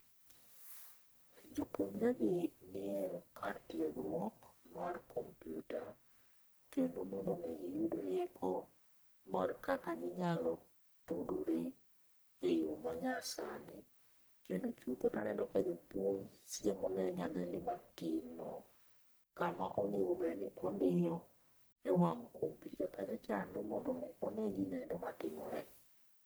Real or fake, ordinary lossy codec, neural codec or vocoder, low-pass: fake; none; codec, 44.1 kHz, 1.7 kbps, Pupu-Codec; none